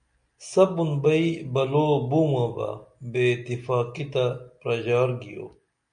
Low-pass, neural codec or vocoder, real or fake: 9.9 kHz; none; real